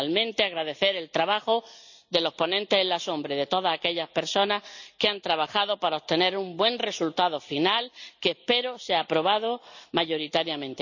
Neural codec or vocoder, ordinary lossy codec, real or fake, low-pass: none; none; real; 7.2 kHz